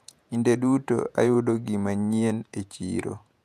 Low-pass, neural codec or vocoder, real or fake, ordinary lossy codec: 14.4 kHz; vocoder, 48 kHz, 128 mel bands, Vocos; fake; none